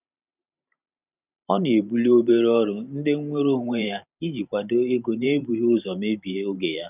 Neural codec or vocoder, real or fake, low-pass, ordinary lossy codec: vocoder, 44.1 kHz, 128 mel bands every 512 samples, BigVGAN v2; fake; 3.6 kHz; none